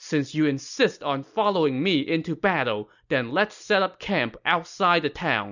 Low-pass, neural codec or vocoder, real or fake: 7.2 kHz; none; real